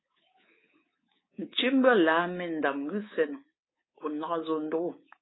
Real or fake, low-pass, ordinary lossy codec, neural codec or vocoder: fake; 7.2 kHz; AAC, 16 kbps; codec, 24 kHz, 3.1 kbps, DualCodec